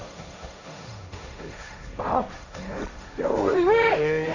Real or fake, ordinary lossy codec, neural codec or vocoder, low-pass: fake; none; codec, 16 kHz, 1.1 kbps, Voila-Tokenizer; 7.2 kHz